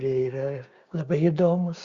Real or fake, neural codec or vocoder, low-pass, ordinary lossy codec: fake; codec, 16 kHz, 6 kbps, DAC; 7.2 kHz; Opus, 64 kbps